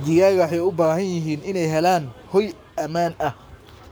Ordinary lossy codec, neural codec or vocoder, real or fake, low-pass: none; codec, 44.1 kHz, 7.8 kbps, Pupu-Codec; fake; none